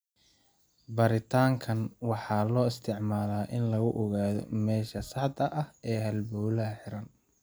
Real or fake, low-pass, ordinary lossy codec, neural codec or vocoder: real; none; none; none